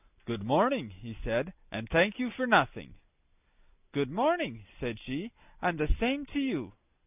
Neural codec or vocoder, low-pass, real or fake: none; 3.6 kHz; real